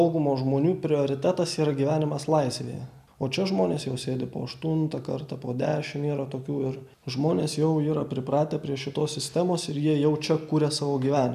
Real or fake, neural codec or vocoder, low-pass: real; none; 14.4 kHz